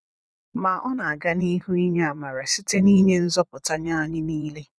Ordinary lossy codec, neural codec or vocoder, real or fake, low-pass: none; vocoder, 22.05 kHz, 80 mel bands, Vocos; fake; none